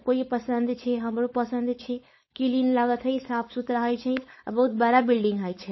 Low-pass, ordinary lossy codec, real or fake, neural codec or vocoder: 7.2 kHz; MP3, 24 kbps; fake; codec, 16 kHz, 4.8 kbps, FACodec